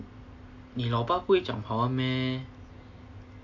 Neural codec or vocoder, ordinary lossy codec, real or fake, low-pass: none; AAC, 48 kbps; real; 7.2 kHz